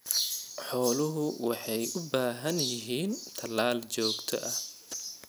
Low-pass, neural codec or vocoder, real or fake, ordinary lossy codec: none; none; real; none